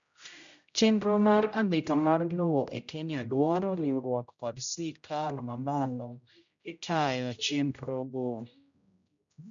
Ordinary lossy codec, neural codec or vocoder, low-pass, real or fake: MP3, 64 kbps; codec, 16 kHz, 0.5 kbps, X-Codec, HuBERT features, trained on general audio; 7.2 kHz; fake